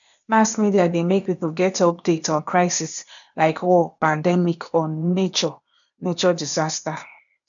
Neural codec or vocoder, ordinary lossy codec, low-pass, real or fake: codec, 16 kHz, 0.8 kbps, ZipCodec; none; 7.2 kHz; fake